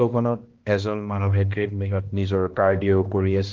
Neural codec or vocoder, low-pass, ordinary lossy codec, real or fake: codec, 16 kHz, 1 kbps, X-Codec, HuBERT features, trained on balanced general audio; 7.2 kHz; Opus, 16 kbps; fake